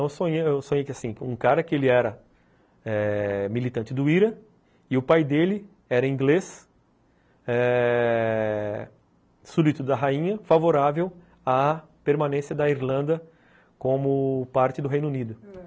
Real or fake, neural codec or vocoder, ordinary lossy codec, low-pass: real; none; none; none